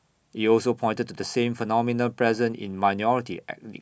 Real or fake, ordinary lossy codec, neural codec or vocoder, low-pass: real; none; none; none